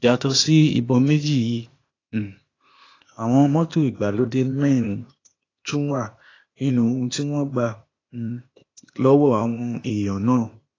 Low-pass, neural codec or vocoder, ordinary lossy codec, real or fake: 7.2 kHz; codec, 16 kHz, 0.8 kbps, ZipCodec; AAC, 32 kbps; fake